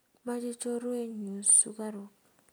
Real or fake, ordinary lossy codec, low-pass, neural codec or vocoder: real; none; none; none